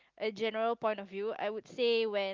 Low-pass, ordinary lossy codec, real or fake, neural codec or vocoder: 7.2 kHz; Opus, 24 kbps; real; none